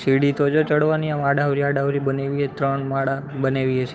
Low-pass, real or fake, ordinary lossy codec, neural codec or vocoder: none; fake; none; codec, 16 kHz, 8 kbps, FunCodec, trained on Chinese and English, 25 frames a second